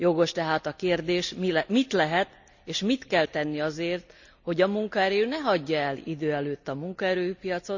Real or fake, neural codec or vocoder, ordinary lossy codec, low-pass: real; none; none; 7.2 kHz